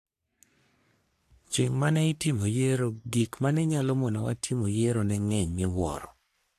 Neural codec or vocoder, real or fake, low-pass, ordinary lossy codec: codec, 44.1 kHz, 3.4 kbps, Pupu-Codec; fake; 14.4 kHz; AAC, 64 kbps